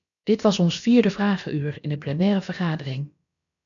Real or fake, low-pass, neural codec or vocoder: fake; 7.2 kHz; codec, 16 kHz, about 1 kbps, DyCAST, with the encoder's durations